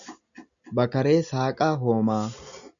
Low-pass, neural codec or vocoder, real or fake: 7.2 kHz; none; real